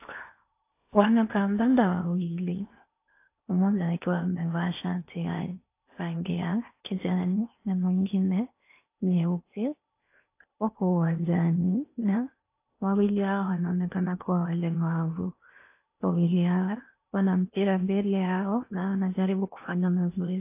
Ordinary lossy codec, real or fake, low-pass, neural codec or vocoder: AAC, 24 kbps; fake; 3.6 kHz; codec, 16 kHz in and 24 kHz out, 0.8 kbps, FocalCodec, streaming, 65536 codes